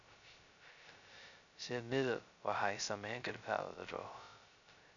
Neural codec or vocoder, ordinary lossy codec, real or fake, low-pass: codec, 16 kHz, 0.2 kbps, FocalCodec; none; fake; 7.2 kHz